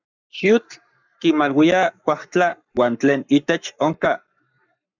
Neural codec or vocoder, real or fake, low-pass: codec, 44.1 kHz, 7.8 kbps, Pupu-Codec; fake; 7.2 kHz